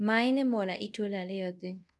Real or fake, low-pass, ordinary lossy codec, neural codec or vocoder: fake; none; none; codec, 24 kHz, 0.5 kbps, DualCodec